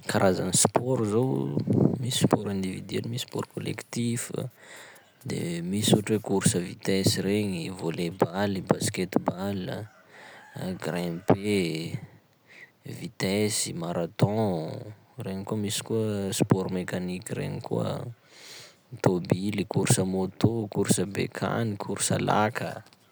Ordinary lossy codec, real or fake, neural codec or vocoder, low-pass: none; real; none; none